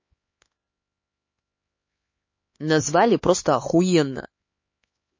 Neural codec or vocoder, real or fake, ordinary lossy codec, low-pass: codec, 16 kHz, 4 kbps, X-Codec, HuBERT features, trained on LibriSpeech; fake; MP3, 32 kbps; 7.2 kHz